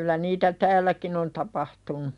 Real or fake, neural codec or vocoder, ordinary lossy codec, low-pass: real; none; none; 10.8 kHz